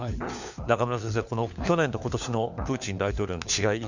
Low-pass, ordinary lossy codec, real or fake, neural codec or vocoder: 7.2 kHz; none; fake; codec, 16 kHz, 4 kbps, FunCodec, trained on LibriTTS, 50 frames a second